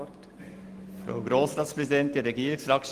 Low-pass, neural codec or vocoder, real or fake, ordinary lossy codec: 14.4 kHz; none; real; Opus, 24 kbps